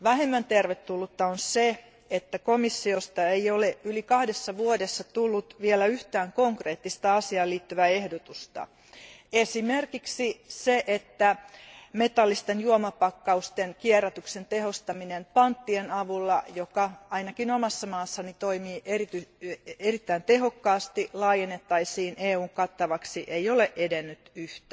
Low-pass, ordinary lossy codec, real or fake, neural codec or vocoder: none; none; real; none